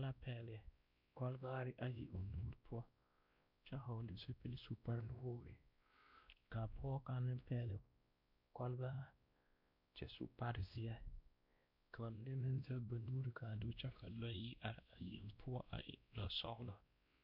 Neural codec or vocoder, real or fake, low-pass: codec, 16 kHz, 1 kbps, X-Codec, WavLM features, trained on Multilingual LibriSpeech; fake; 5.4 kHz